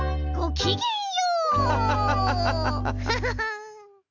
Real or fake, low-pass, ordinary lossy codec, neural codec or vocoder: real; 7.2 kHz; MP3, 64 kbps; none